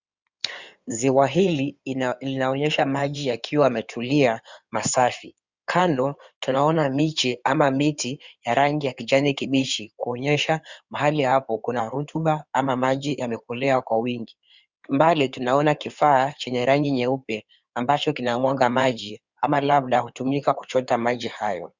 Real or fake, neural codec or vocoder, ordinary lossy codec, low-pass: fake; codec, 16 kHz in and 24 kHz out, 2.2 kbps, FireRedTTS-2 codec; Opus, 64 kbps; 7.2 kHz